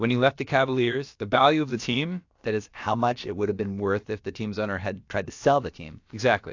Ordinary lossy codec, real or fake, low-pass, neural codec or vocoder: AAC, 48 kbps; fake; 7.2 kHz; codec, 16 kHz, about 1 kbps, DyCAST, with the encoder's durations